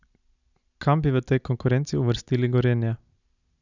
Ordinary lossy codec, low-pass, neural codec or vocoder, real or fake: none; 7.2 kHz; none; real